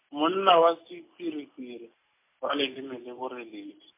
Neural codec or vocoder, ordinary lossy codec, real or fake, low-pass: none; MP3, 24 kbps; real; 3.6 kHz